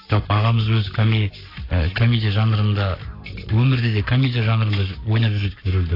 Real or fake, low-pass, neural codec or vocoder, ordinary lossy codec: fake; 5.4 kHz; codec, 44.1 kHz, 7.8 kbps, Pupu-Codec; AAC, 32 kbps